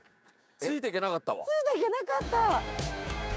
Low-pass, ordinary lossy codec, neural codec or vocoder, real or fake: none; none; codec, 16 kHz, 6 kbps, DAC; fake